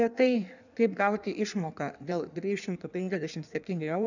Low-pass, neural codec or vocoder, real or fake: 7.2 kHz; codec, 16 kHz in and 24 kHz out, 1.1 kbps, FireRedTTS-2 codec; fake